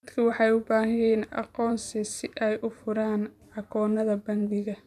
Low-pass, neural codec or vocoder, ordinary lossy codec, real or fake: 14.4 kHz; vocoder, 44.1 kHz, 128 mel bands every 512 samples, BigVGAN v2; none; fake